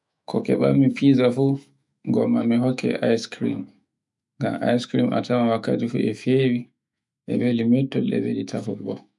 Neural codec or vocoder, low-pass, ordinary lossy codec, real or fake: autoencoder, 48 kHz, 128 numbers a frame, DAC-VAE, trained on Japanese speech; 10.8 kHz; none; fake